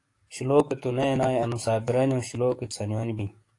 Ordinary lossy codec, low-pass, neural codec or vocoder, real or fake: AAC, 48 kbps; 10.8 kHz; codec, 44.1 kHz, 7.8 kbps, DAC; fake